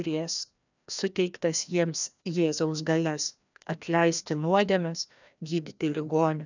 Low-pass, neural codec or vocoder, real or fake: 7.2 kHz; codec, 16 kHz, 1 kbps, FreqCodec, larger model; fake